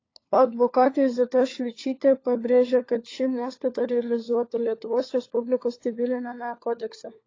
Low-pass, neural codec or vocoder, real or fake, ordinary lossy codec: 7.2 kHz; codec, 16 kHz, 4 kbps, FunCodec, trained on LibriTTS, 50 frames a second; fake; AAC, 32 kbps